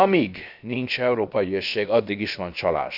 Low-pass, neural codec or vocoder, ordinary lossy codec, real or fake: 5.4 kHz; codec, 16 kHz, about 1 kbps, DyCAST, with the encoder's durations; none; fake